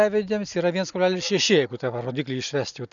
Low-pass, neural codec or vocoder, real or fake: 7.2 kHz; none; real